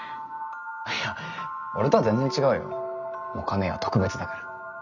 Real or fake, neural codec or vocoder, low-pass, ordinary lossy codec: real; none; 7.2 kHz; none